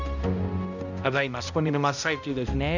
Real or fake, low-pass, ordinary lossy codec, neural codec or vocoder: fake; 7.2 kHz; none; codec, 16 kHz, 0.5 kbps, X-Codec, HuBERT features, trained on balanced general audio